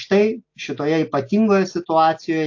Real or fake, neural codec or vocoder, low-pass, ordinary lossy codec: real; none; 7.2 kHz; AAC, 48 kbps